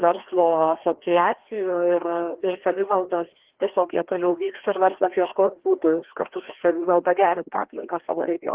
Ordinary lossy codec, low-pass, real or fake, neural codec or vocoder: Opus, 16 kbps; 3.6 kHz; fake; codec, 16 kHz, 1 kbps, FreqCodec, larger model